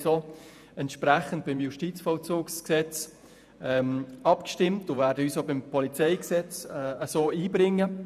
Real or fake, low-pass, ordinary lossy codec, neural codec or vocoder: fake; 14.4 kHz; none; vocoder, 48 kHz, 128 mel bands, Vocos